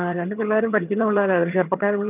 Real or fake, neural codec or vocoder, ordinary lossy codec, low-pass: fake; vocoder, 22.05 kHz, 80 mel bands, HiFi-GAN; none; 3.6 kHz